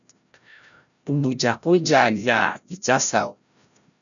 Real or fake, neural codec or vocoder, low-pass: fake; codec, 16 kHz, 0.5 kbps, FreqCodec, larger model; 7.2 kHz